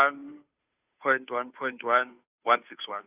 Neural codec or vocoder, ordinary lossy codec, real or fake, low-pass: codec, 16 kHz, 2 kbps, FunCodec, trained on Chinese and English, 25 frames a second; Opus, 64 kbps; fake; 3.6 kHz